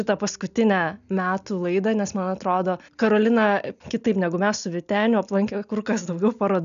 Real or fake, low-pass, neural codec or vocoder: real; 7.2 kHz; none